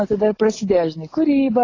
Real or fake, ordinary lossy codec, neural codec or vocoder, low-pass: real; AAC, 32 kbps; none; 7.2 kHz